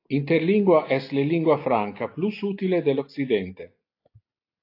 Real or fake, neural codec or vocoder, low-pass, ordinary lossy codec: real; none; 5.4 kHz; AAC, 32 kbps